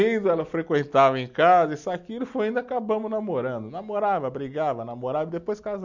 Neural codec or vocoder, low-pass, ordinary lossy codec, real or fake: none; 7.2 kHz; none; real